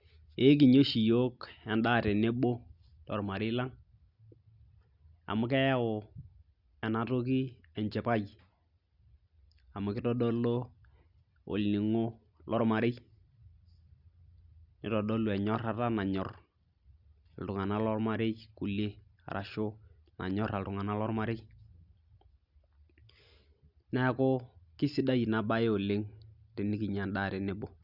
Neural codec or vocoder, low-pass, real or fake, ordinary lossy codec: none; 5.4 kHz; real; Opus, 64 kbps